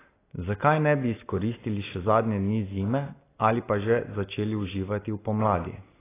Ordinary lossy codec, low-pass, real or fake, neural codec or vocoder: AAC, 16 kbps; 3.6 kHz; real; none